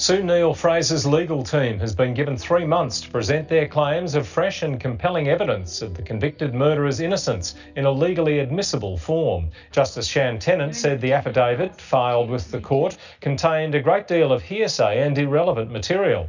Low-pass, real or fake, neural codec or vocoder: 7.2 kHz; real; none